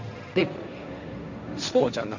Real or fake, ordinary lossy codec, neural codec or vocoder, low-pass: fake; MP3, 64 kbps; codec, 16 kHz, 1.1 kbps, Voila-Tokenizer; 7.2 kHz